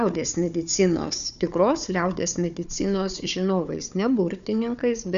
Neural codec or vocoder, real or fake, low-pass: codec, 16 kHz, 4 kbps, FunCodec, trained on Chinese and English, 50 frames a second; fake; 7.2 kHz